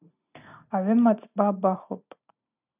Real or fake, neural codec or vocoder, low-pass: real; none; 3.6 kHz